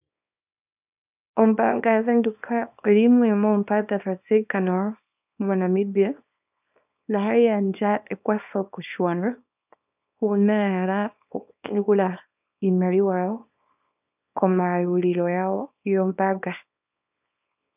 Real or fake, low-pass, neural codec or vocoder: fake; 3.6 kHz; codec, 24 kHz, 0.9 kbps, WavTokenizer, small release